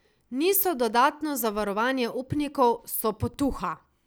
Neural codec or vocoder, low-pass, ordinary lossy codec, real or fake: none; none; none; real